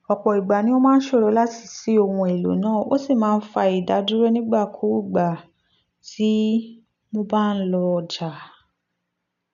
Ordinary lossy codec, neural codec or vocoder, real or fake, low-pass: none; none; real; 7.2 kHz